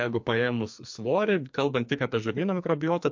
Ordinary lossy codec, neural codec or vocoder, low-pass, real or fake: MP3, 48 kbps; codec, 44.1 kHz, 2.6 kbps, SNAC; 7.2 kHz; fake